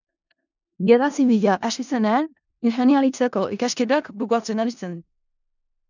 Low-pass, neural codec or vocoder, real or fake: 7.2 kHz; codec, 16 kHz in and 24 kHz out, 0.4 kbps, LongCat-Audio-Codec, four codebook decoder; fake